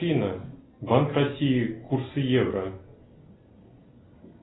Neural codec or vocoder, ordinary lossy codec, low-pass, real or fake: none; AAC, 16 kbps; 7.2 kHz; real